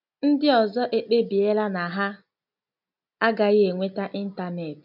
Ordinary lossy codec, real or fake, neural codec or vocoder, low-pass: none; real; none; 5.4 kHz